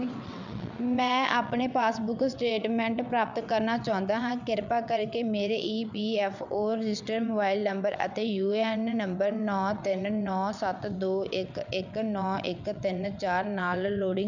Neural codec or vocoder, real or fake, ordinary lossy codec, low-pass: vocoder, 22.05 kHz, 80 mel bands, WaveNeXt; fake; none; 7.2 kHz